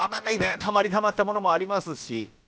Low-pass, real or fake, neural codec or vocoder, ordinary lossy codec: none; fake; codec, 16 kHz, about 1 kbps, DyCAST, with the encoder's durations; none